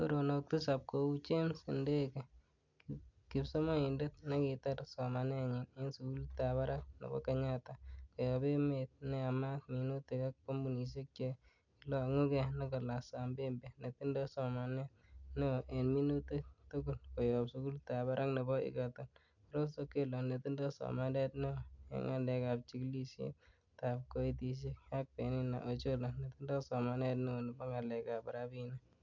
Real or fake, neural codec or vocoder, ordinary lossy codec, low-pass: real; none; none; 7.2 kHz